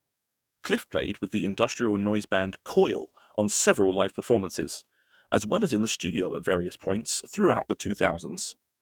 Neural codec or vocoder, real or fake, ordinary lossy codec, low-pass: codec, 44.1 kHz, 2.6 kbps, DAC; fake; none; 19.8 kHz